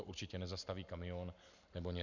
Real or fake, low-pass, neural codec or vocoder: real; 7.2 kHz; none